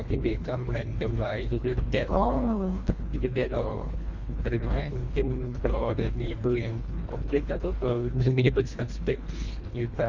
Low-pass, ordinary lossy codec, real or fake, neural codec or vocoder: 7.2 kHz; Opus, 64 kbps; fake; codec, 24 kHz, 1.5 kbps, HILCodec